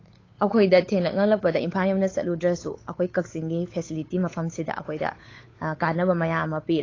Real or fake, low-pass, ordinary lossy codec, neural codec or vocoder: fake; 7.2 kHz; AAC, 32 kbps; codec, 16 kHz, 8 kbps, FunCodec, trained on Chinese and English, 25 frames a second